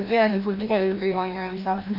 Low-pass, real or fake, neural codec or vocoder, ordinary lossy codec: 5.4 kHz; fake; codec, 16 kHz, 1 kbps, FreqCodec, larger model; none